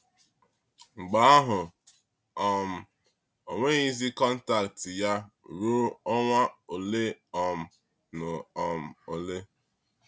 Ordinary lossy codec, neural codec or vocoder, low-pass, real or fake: none; none; none; real